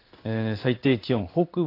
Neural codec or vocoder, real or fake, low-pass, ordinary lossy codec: codec, 16 kHz in and 24 kHz out, 1 kbps, XY-Tokenizer; fake; 5.4 kHz; AAC, 32 kbps